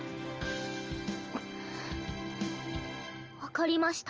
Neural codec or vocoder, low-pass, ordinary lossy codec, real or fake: none; 7.2 kHz; Opus, 24 kbps; real